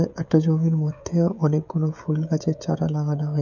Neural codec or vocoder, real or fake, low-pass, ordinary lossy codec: codec, 16 kHz, 16 kbps, FreqCodec, smaller model; fake; 7.2 kHz; none